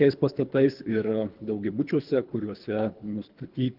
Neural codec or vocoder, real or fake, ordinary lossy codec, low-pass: codec, 24 kHz, 3 kbps, HILCodec; fake; Opus, 32 kbps; 5.4 kHz